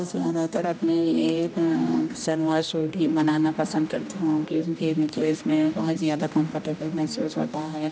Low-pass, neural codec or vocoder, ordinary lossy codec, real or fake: none; codec, 16 kHz, 1 kbps, X-Codec, HuBERT features, trained on general audio; none; fake